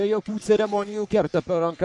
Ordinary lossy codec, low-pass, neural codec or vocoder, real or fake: MP3, 96 kbps; 10.8 kHz; codec, 44.1 kHz, 3.4 kbps, Pupu-Codec; fake